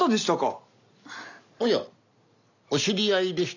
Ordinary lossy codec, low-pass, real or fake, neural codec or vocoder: none; 7.2 kHz; real; none